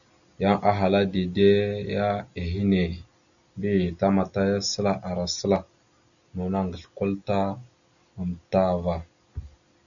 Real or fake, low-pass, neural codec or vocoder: real; 7.2 kHz; none